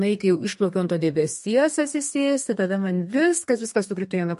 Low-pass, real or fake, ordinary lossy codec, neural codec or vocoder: 14.4 kHz; fake; MP3, 48 kbps; codec, 32 kHz, 1.9 kbps, SNAC